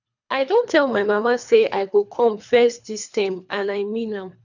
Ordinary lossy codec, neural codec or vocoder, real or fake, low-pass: none; codec, 24 kHz, 3 kbps, HILCodec; fake; 7.2 kHz